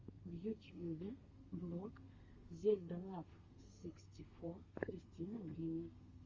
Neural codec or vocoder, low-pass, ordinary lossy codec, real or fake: codec, 32 kHz, 1.9 kbps, SNAC; 7.2 kHz; Opus, 32 kbps; fake